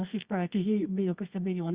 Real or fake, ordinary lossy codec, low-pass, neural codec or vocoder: fake; Opus, 64 kbps; 3.6 kHz; codec, 24 kHz, 0.9 kbps, WavTokenizer, medium music audio release